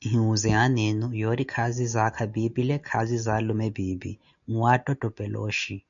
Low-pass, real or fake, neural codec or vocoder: 7.2 kHz; real; none